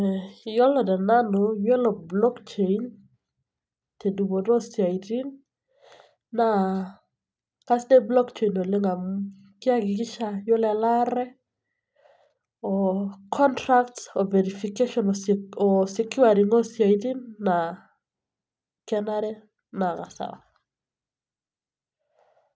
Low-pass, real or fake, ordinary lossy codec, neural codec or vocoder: none; real; none; none